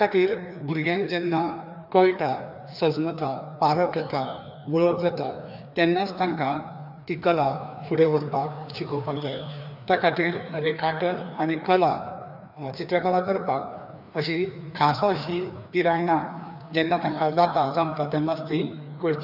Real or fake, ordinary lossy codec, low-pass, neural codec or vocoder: fake; none; 5.4 kHz; codec, 16 kHz, 2 kbps, FreqCodec, larger model